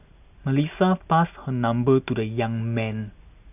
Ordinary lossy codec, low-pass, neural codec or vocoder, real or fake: none; 3.6 kHz; none; real